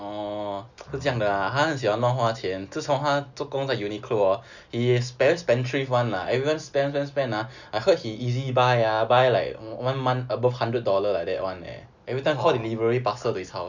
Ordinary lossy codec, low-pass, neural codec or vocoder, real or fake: none; 7.2 kHz; none; real